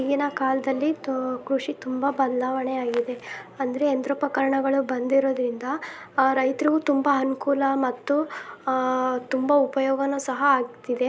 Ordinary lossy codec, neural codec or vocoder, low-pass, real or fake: none; none; none; real